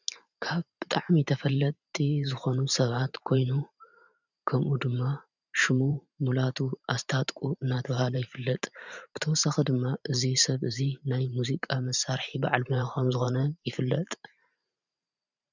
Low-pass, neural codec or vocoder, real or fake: 7.2 kHz; vocoder, 44.1 kHz, 128 mel bands every 512 samples, BigVGAN v2; fake